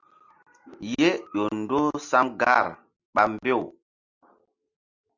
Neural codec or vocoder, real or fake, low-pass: none; real; 7.2 kHz